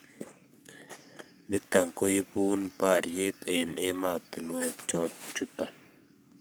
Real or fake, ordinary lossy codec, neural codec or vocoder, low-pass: fake; none; codec, 44.1 kHz, 3.4 kbps, Pupu-Codec; none